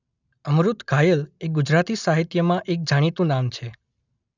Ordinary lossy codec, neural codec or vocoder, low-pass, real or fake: none; none; 7.2 kHz; real